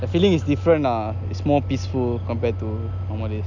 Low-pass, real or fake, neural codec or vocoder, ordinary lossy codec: 7.2 kHz; real; none; none